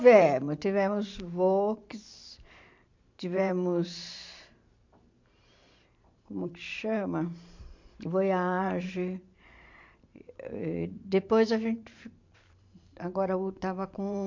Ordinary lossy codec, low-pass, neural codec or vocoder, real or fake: MP3, 48 kbps; 7.2 kHz; vocoder, 44.1 kHz, 128 mel bands every 512 samples, BigVGAN v2; fake